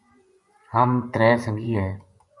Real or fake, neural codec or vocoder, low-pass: fake; vocoder, 24 kHz, 100 mel bands, Vocos; 10.8 kHz